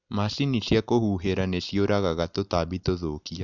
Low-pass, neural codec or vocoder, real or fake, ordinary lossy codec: 7.2 kHz; none; real; none